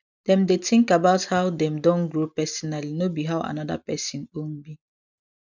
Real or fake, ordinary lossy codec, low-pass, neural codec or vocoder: real; none; 7.2 kHz; none